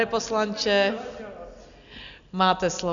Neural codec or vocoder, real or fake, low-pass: none; real; 7.2 kHz